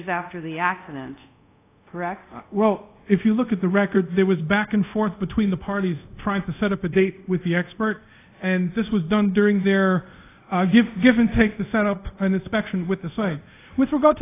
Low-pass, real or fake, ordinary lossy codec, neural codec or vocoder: 3.6 kHz; fake; AAC, 24 kbps; codec, 24 kHz, 0.5 kbps, DualCodec